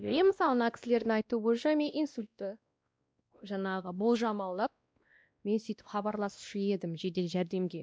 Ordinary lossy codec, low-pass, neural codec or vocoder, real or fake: none; none; codec, 16 kHz, 1 kbps, X-Codec, WavLM features, trained on Multilingual LibriSpeech; fake